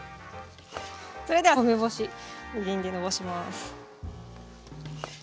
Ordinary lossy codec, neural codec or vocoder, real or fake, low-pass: none; none; real; none